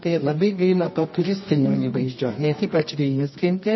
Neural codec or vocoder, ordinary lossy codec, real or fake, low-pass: codec, 24 kHz, 0.9 kbps, WavTokenizer, medium music audio release; MP3, 24 kbps; fake; 7.2 kHz